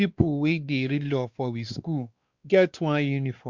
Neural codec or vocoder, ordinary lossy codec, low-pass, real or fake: codec, 16 kHz, 2 kbps, X-Codec, WavLM features, trained on Multilingual LibriSpeech; Opus, 64 kbps; 7.2 kHz; fake